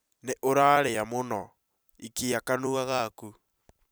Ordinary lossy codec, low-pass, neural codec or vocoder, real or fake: none; none; vocoder, 44.1 kHz, 128 mel bands every 256 samples, BigVGAN v2; fake